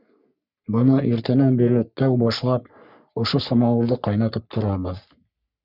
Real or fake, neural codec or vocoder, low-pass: fake; codec, 44.1 kHz, 3.4 kbps, Pupu-Codec; 5.4 kHz